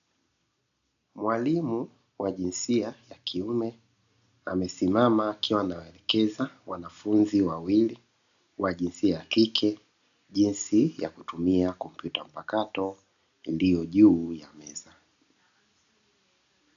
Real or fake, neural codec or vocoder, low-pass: real; none; 7.2 kHz